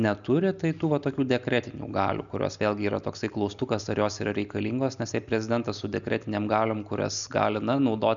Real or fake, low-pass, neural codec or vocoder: real; 7.2 kHz; none